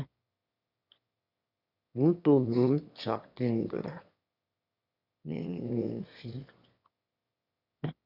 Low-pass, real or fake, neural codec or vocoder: 5.4 kHz; fake; autoencoder, 22.05 kHz, a latent of 192 numbers a frame, VITS, trained on one speaker